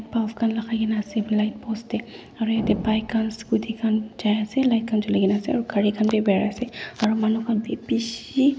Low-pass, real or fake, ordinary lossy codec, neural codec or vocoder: none; real; none; none